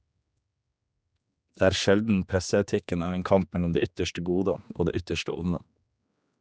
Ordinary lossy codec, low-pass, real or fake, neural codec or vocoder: none; none; fake; codec, 16 kHz, 2 kbps, X-Codec, HuBERT features, trained on general audio